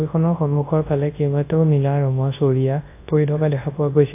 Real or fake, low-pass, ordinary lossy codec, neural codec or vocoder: fake; 3.6 kHz; AAC, 24 kbps; codec, 24 kHz, 0.9 kbps, WavTokenizer, large speech release